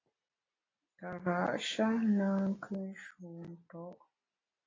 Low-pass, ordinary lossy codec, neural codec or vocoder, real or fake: 7.2 kHz; AAC, 32 kbps; none; real